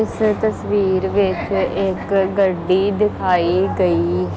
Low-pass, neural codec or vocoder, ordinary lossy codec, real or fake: none; none; none; real